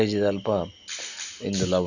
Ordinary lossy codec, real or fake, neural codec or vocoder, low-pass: none; real; none; 7.2 kHz